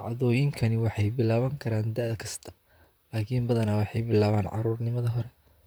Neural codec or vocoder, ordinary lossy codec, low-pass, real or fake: vocoder, 44.1 kHz, 128 mel bands, Pupu-Vocoder; none; none; fake